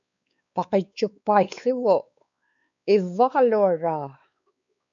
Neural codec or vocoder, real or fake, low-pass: codec, 16 kHz, 4 kbps, X-Codec, WavLM features, trained on Multilingual LibriSpeech; fake; 7.2 kHz